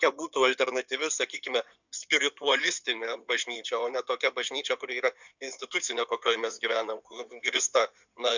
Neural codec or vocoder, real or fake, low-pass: codec, 16 kHz in and 24 kHz out, 2.2 kbps, FireRedTTS-2 codec; fake; 7.2 kHz